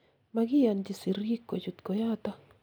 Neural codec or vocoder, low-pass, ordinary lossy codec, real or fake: none; none; none; real